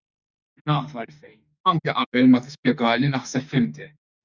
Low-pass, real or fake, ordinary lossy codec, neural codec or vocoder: 7.2 kHz; fake; Opus, 64 kbps; autoencoder, 48 kHz, 32 numbers a frame, DAC-VAE, trained on Japanese speech